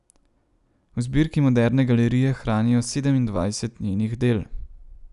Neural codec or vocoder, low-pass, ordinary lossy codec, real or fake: none; 10.8 kHz; none; real